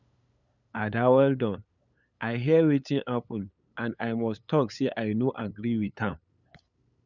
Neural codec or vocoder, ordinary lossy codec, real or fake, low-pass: codec, 16 kHz, 8 kbps, FunCodec, trained on LibriTTS, 25 frames a second; none; fake; 7.2 kHz